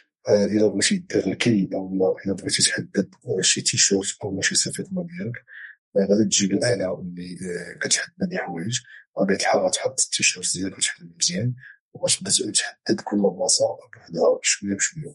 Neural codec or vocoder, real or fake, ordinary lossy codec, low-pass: codec, 32 kHz, 1.9 kbps, SNAC; fake; MP3, 48 kbps; 14.4 kHz